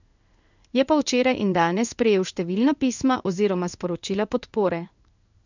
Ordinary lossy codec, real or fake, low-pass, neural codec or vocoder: none; fake; 7.2 kHz; codec, 16 kHz in and 24 kHz out, 1 kbps, XY-Tokenizer